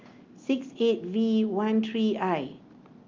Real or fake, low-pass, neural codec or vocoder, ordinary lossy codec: real; 7.2 kHz; none; Opus, 24 kbps